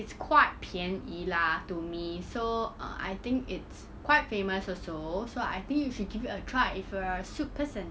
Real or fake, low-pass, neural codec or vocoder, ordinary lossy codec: real; none; none; none